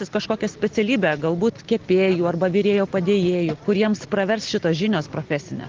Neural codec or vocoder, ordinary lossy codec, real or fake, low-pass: none; Opus, 16 kbps; real; 7.2 kHz